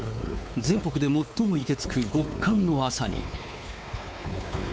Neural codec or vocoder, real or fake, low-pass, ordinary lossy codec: codec, 16 kHz, 4 kbps, X-Codec, WavLM features, trained on Multilingual LibriSpeech; fake; none; none